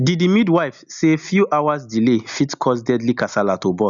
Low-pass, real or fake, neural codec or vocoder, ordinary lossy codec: 7.2 kHz; real; none; none